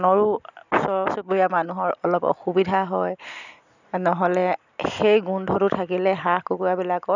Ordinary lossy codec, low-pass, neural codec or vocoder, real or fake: none; 7.2 kHz; none; real